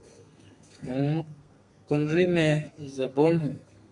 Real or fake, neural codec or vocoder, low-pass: fake; codec, 32 kHz, 1.9 kbps, SNAC; 10.8 kHz